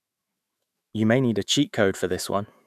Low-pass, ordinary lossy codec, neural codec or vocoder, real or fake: 14.4 kHz; none; autoencoder, 48 kHz, 128 numbers a frame, DAC-VAE, trained on Japanese speech; fake